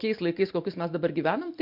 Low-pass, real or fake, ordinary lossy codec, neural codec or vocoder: 5.4 kHz; real; MP3, 48 kbps; none